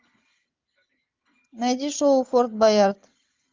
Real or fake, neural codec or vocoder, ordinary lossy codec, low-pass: real; none; Opus, 24 kbps; 7.2 kHz